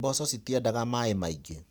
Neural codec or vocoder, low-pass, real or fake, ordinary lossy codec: vocoder, 44.1 kHz, 128 mel bands every 512 samples, BigVGAN v2; none; fake; none